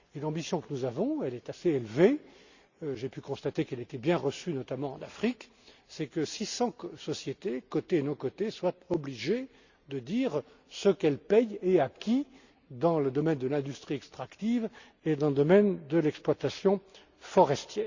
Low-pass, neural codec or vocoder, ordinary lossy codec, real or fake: 7.2 kHz; none; Opus, 64 kbps; real